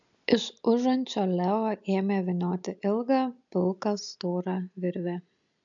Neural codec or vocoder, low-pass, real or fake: none; 7.2 kHz; real